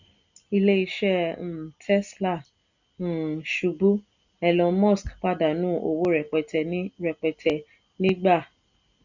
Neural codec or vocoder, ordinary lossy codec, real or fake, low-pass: none; none; real; 7.2 kHz